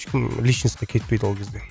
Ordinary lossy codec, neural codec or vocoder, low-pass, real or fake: none; none; none; real